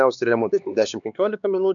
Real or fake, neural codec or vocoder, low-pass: fake; codec, 16 kHz, 4 kbps, X-Codec, HuBERT features, trained on LibriSpeech; 7.2 kHz